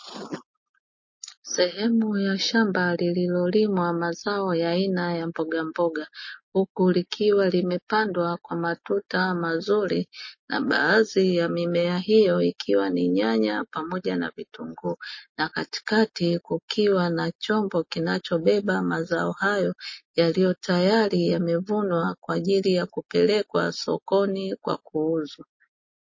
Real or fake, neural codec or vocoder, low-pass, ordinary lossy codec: real; none; 7.2 kHz; MP3, 32 kbps